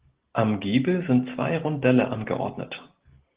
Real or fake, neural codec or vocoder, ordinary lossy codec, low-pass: real; none; Opus, 16 kbps; 3.6 kHz